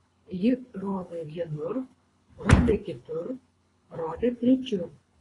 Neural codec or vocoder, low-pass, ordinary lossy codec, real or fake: codec, 24 kHz, 3 kbps, HILCodec; 10.8 kHz; AAC, 32 kbps; fake